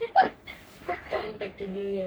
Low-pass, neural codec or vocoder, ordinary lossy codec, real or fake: none; codec, 44.1 kHz, 3.4 kbps, Pupu-Codec; none; fake